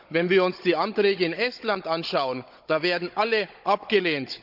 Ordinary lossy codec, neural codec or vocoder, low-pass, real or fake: none; codec, 16 kHz, 16 kbps, FunCodec, trained on Chinese and English, 50 frames a second; 5.4 kHz; fake